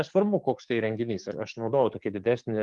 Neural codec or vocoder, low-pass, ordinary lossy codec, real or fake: codec, 44.1 kHz, 7.8 kbps, DAC; 10.8 kHz; Opus, 24 kbps; fake